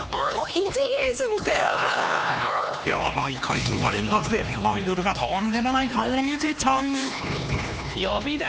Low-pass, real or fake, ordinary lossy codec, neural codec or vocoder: none; fake; none; codec, 16 kHz, 2 kbps, X-Codec, HuBERT features, trained on LibriSpeech